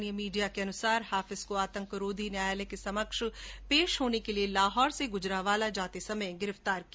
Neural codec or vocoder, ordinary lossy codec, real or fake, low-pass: none; none; real; none